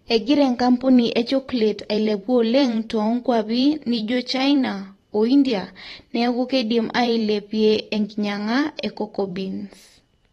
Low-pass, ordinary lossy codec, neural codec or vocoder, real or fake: 19.8 kHz; AAC, 32 kbps; vocoder, 44.1 kHz, 128 mel bands every 512 samples, BigVGAN v2; fake